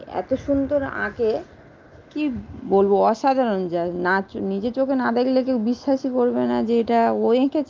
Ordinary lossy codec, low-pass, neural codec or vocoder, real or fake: Opus, 24 kbps; 7.2 kHz; none; real